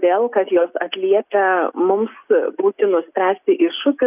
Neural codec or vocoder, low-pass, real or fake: none; 3.6 kHz; real